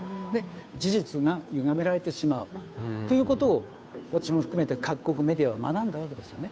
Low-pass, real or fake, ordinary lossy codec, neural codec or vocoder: none; fake; none; codec, 16 kHz, 2 kbps, FunCodec, trained on Chinese and English, 25 frames a second